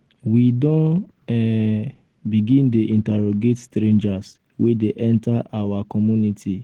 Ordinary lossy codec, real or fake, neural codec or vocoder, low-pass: Opus, 16 kbps; real; none; 19.8 kHz